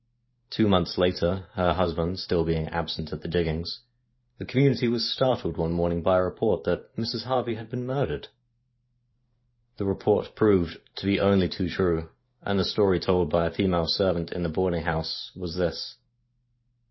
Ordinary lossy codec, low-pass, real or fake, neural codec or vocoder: MP3, 24 kbps; 7.2 kHz; fake; vocoder, 44.1 kHz, 128 mel bands every 512 samples, BigVGAN v2